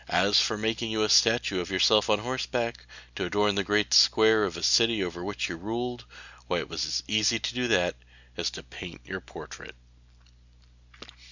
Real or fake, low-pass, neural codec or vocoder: real; 7.2 kHz; none